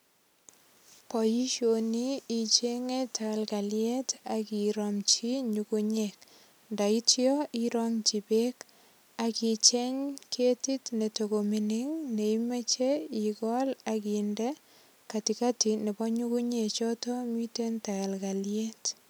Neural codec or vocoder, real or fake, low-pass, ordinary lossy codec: none; real; none; none